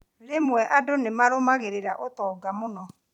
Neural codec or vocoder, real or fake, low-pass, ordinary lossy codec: vocoder, 44.1 kHz, 128 mel bands every 256 samples, BigVGAN v2; fake; 19.8 kHz; none